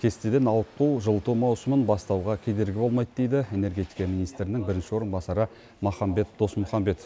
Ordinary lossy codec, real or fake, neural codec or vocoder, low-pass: none; real; none; none